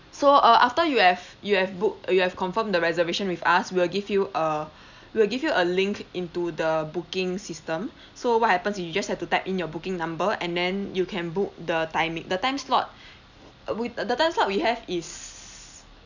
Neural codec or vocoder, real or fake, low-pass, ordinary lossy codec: none; real; 7.2 kHz; none